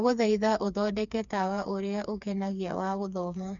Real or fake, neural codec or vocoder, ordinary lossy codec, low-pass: fake; codec, 16 kHz, 4 kbps, FreqCodec, smaller model; none; 7.2 kHz